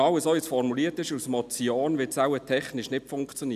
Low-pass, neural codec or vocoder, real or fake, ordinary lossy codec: 14.4 kHz; none; real; none